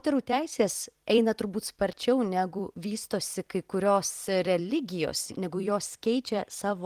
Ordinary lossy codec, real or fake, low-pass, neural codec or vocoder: Opus, 24 kbps; fake; 14.4 kHz; vocoder, 44.1 kHz, 128 mel bands every 256 samples, BigVGAN v2